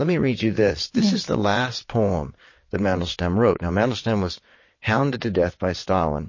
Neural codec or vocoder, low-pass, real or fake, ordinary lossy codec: vocoder, 22.05 kHz, 80 mel bands, WaveNeXt; 7.2 kHz; fake; MP3, 32 kbps